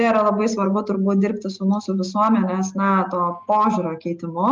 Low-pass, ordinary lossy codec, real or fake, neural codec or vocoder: 7.2 kHz; Opus, 32 kbps; real; none